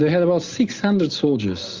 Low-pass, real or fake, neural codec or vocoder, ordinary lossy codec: 7.2 kHz; real; none; Opus, 32 kbps